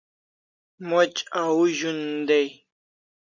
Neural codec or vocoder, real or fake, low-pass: none; real; 7.2 kHz